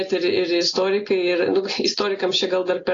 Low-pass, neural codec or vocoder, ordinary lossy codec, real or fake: 7.2 kHz; none; AAC, 32 kbps; real